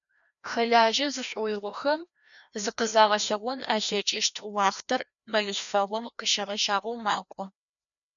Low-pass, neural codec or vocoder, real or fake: 7.2 kHz; codec, 16 kHz, 1 kbps, FreqCodec, larger model; fake